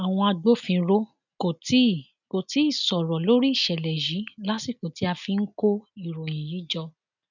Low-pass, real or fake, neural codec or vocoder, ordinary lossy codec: 7.2 kHz; real; none; none